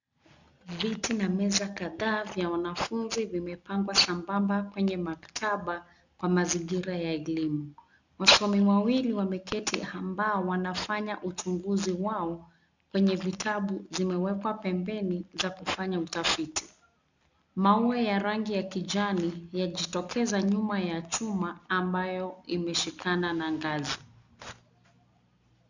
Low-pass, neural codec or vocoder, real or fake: 7.2 kHz; none; real